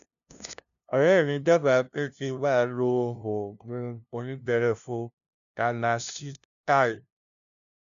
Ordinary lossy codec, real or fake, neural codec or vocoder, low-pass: none; fake; codec, 16 kHz, 0.5 kbps, FunCodec, trained on LibriTTS, 25 frames a second; 7.2 kHz